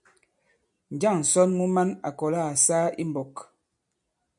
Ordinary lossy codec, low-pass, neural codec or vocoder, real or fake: MP3, 96 kbps; 10.8 kHz; none; real